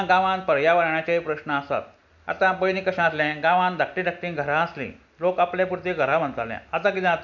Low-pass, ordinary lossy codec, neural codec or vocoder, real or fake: 7.2 kHz; none; none; real